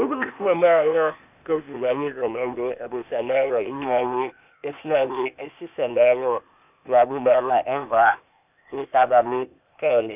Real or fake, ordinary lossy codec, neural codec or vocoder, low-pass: fake; none; codec, 16 kHz, 0.8 kbps, ZipCodec; 3.6 kHz